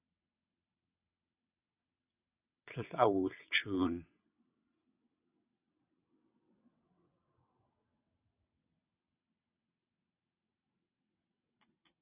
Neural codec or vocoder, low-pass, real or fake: vocoder, 22.05 kHz, 80 mel bands, WaveNeXt; 3.6 kHz; fake